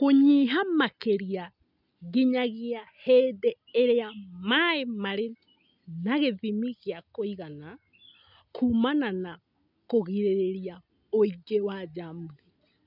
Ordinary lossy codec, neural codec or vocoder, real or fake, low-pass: none; none; real; 5.4 kHz